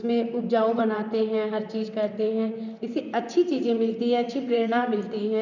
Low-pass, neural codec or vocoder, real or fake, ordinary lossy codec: 7.2 kHz; vocoder, 44.1 kHz, 128 mel bands, Pupu-Vocoder; fake; none